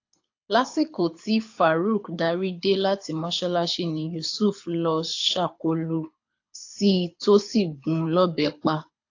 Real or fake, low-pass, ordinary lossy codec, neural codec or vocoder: fake; 7.2 kHz; AAC, 48 kbps; codec, 24 kHz, 6 kbps, HILCodec